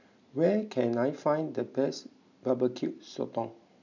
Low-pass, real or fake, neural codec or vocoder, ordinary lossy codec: 7.2 kHz; real; none; none